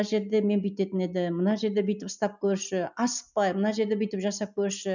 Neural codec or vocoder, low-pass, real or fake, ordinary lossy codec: none; 7.2 kHz; real; none